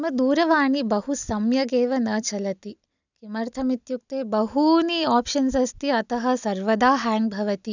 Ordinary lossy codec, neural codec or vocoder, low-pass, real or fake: none; none; 7.2 kHz; real